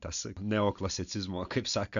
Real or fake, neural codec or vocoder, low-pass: real; none; 7.2 kHz